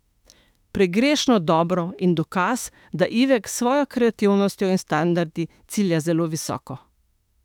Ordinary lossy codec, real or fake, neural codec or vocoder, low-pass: none; fake; autoencoder, 48 kHz, 32 numbers a frame, DAC-VAE, trained on Japanese speech; 19.8 kHz